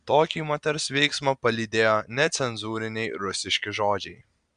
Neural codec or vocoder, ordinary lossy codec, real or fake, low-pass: none; Opus, 64 kbps; real; 9.9 kHz